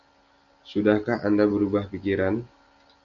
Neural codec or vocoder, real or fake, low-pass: none; real; 7.2 kHz